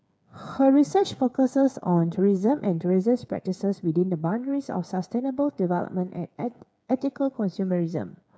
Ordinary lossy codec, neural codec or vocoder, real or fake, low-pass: none; codec, 16 kHz, 8 kbps, FreqCodec, smaller model; fake; none